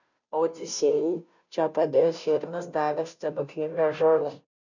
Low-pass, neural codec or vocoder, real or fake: 7.2 kHz; codec, 16 kHz, 0.5 kbps, FunCodec, trained on Chinese and English, 25 frames a second; fake